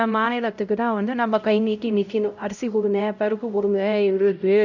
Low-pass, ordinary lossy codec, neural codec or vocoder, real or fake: 7.2 kHz; none; codec, 16 kHz, 0.5 kbps, X-Codec, HuBERT features, trained on LibriSpeech; fake